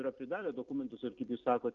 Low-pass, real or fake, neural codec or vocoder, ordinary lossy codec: 7.2 kHz; real; none; Opus, 24 kbps